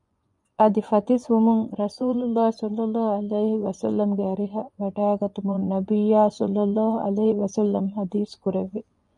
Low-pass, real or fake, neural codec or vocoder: 10.8 kHz; fake; vocoder, 24 kHz, 100 mel bands, Vocos